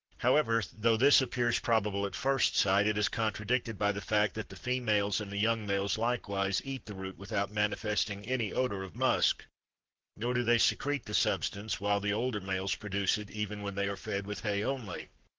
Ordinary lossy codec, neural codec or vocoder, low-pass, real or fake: Opus, 16 kbps; codec, 44.1 kHz, 7.8 kbps, Pupu-Codec; 7.2 kHz; fake